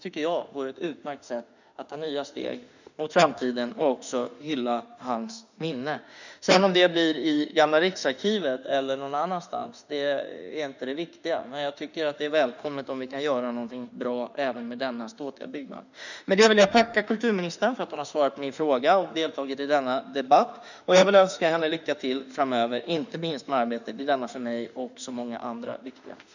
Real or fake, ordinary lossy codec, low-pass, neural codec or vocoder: fake; none; 7.2 kHz; autoencoder, 48 kHz, 32 numbers a frame, DAC-VAE, trained on Japanese speech